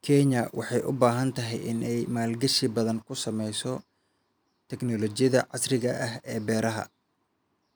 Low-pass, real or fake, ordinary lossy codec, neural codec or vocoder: none; real; none; none